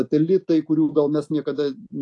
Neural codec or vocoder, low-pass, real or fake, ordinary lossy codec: none; 10.8 kHz; real; AAC, 48 kbps